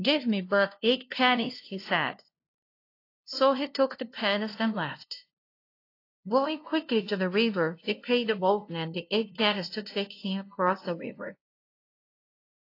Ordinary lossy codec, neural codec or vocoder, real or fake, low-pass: AAC, 32 kbps; codec, 16 kHz, 0.5 kbps, FunCodec, trained on LibriTTS, 25 frames a second; fake; 5.4 kHz